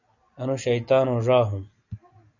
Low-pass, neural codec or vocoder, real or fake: 7.2 kHz; none; real